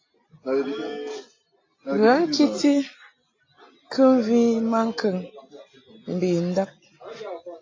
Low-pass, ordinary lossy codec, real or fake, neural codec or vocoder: 7.2 kHz; AAC, 32 kbps; real; none